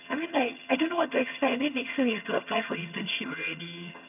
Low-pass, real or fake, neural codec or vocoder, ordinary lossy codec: 3.6 kHz; fake; vocoder, 22.05 kHz, 80 mel bands, HiFi-GAN; none